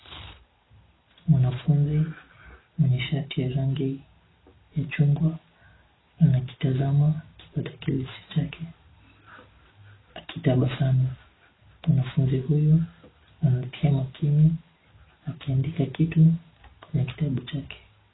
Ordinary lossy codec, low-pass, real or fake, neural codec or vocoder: AAC, 16 kbps; 7.2 kHz; real; none